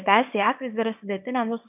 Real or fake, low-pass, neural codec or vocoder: fake; 3.6 kHz; codec, 24 kHz, 0.9 kbps, WavTokenizer, small release